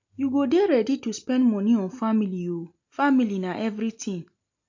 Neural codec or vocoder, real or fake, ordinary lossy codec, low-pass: none; real; MP3, 48 kbps; 7.2 kHz